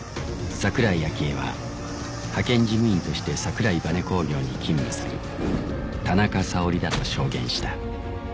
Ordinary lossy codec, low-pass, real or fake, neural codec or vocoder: none; none; real; none